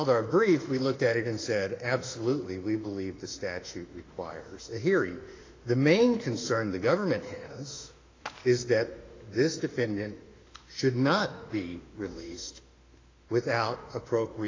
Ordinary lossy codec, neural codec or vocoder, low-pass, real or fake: AAC, 32 kbps; autoencoder, 48 kHz, 32 numbers a frame, DAC-VAE, trained on Japanese speech; 7.2 kHz; fake